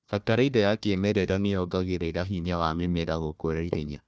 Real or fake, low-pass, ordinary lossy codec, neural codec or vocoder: fake; none; none; codec, 16 kHz, 1 kbps, FunCodec, trained on Chinese and English, 50 frames a second